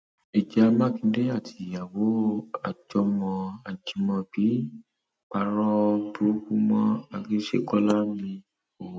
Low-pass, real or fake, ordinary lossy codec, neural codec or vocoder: none; real; none; none